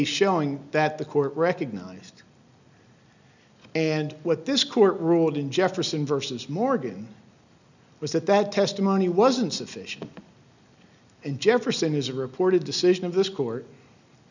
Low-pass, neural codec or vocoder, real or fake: 7.2 kHz; none; real